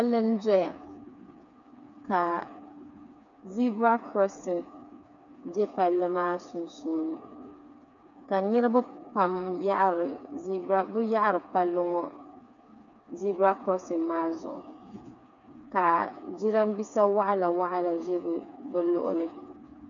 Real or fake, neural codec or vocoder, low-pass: fake; codec, 16 kHz, 4 kbps, FreqCodec, smaller model; 7.2 kHz